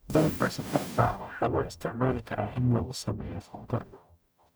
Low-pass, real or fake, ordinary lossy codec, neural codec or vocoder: none; fake; none; codec, 44.1 kHz, 0.9 kbps, DAC